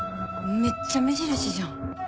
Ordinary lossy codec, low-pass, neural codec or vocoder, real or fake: none; none; none; real